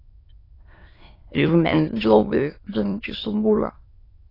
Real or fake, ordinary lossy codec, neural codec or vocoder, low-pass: fake; AAC, 24 kbps; autoencoder, 22.05 kHz, a latent of 192 numbers a frame, VITS, trained on many speakers; 5.4 kHz